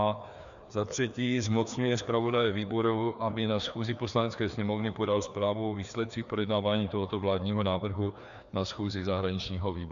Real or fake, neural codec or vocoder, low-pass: fake; codec, 16 kHz, 2 kbps, FreqCodec, larger model; 7.2 kHz